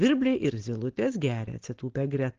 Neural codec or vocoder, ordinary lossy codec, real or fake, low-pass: none; Opus, 16 kbps; real; 7.2 kHz